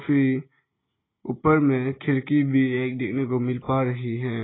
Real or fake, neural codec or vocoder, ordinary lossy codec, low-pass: real; none; AAC, 16 kbps; 7.2 kHz